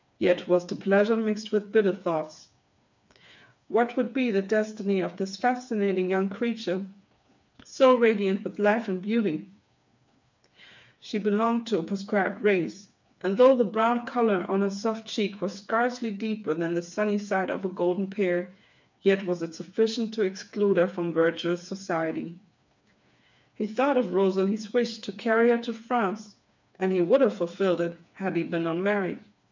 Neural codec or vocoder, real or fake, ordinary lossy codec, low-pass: codec, 16 kHz, 4 kbps, FreqCodec, smaller model; fake; MP3, 64 kbps; 7.2 kHz